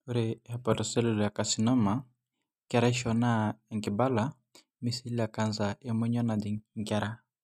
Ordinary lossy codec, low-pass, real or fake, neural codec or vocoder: none; 14.4 kHz; real; none